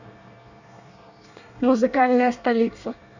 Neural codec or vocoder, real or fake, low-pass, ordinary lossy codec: codec, 24 kHz, 1 kbps, SNAC; fake; 7.2 kHz; none